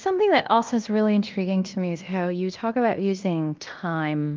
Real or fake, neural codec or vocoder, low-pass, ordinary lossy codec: fake; codec, 16 kHz in and 24 kHz out, 0.9 kbps, LongCat-Audio-Codec, fine tuned four codebook decoder; 7.2 kHz; Opus, 24 kbps